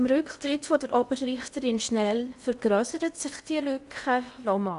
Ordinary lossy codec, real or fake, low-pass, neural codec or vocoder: none; fake; 10.8 kHz; codec, 16 kHz in and 24 kHz out, 0.8 kbps, FocalCodec, streaming, 65536 codes